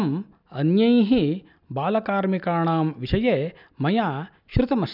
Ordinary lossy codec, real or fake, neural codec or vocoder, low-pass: none; real; none; 5.4 kHz